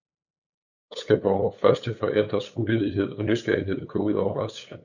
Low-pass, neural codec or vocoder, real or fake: 7.2 kHz; codec, 16 kHz, 8 kbps, FunCodec, trained on LibriTTS, 25 frames a second; fake